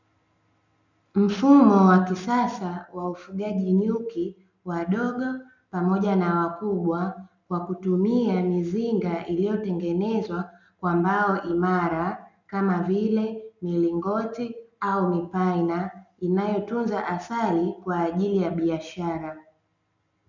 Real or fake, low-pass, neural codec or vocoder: real; 7.2 kHz; none